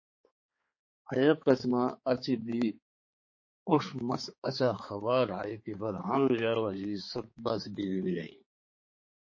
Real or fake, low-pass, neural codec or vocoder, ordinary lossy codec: fake; 7.2 kHz; codec, 16 kHz, 4 kbps, X-Codec, HuBERT features, trained on balanced general audio; MP3, 32 kbps